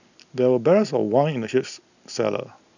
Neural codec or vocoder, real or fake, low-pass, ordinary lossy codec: none; real; 7.2 kHz; none